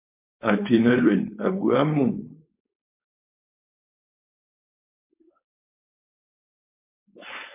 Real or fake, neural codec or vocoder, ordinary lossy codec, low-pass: fake; codec, 16 kHz, 4.8 kbps, FACodec; MP3, 24 kbps; 3.6 kHz